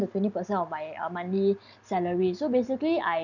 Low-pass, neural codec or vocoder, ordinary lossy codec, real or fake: 7.2 kHz; none; none; real